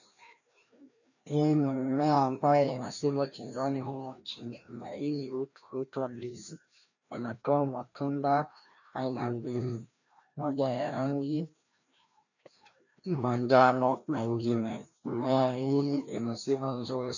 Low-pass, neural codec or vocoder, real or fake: 7.2 kHz; codec, 16 kHz, 1 kbps, FreqCodec, larger model; fake